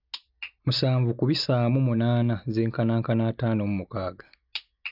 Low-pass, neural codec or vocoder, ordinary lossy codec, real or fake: 5.4 kHz; none; none; real